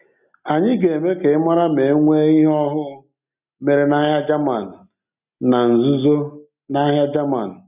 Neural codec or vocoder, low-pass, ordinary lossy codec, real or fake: none; 3.6 kHz; none; real